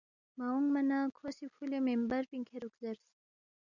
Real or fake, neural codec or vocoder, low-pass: real; none; 7.2 kHz